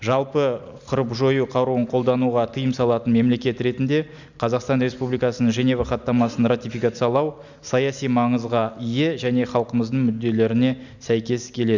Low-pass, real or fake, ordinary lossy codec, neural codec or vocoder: 7.2 kHz; real; none; none